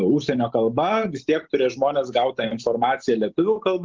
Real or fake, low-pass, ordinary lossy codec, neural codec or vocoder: real; 7.2 kHz; Opus, 32 kbps; none